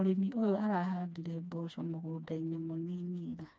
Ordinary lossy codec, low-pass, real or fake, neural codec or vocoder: none; none; fake; codec, 16 kHz, 2 kbps, FreqCodec, smaller model